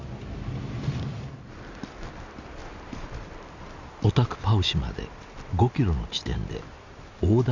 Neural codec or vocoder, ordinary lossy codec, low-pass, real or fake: none; Opus, 64 kbps; 7.2 kHz; real